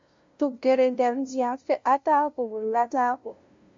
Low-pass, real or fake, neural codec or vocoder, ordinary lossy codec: 7.2 kHz; fake; codec, 16 kHz, 0.5 kbps, FunCodec, trained on LibriTTS, 25 frames a second; MP3, 48 kbps